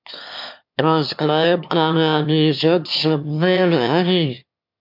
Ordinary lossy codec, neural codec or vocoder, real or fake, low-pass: MP3, 48 kbps; autoencoder, 22.05 kHz, a latent of 192 numbers a frame, VITS, trained on one speaker; fake; 5.4 kHz